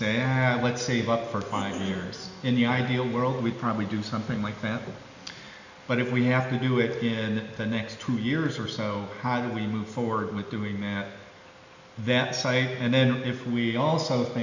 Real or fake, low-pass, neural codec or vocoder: real; 7.2 kHz; none